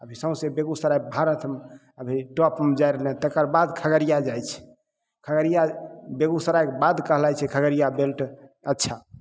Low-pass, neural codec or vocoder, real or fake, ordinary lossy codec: none; none; real; none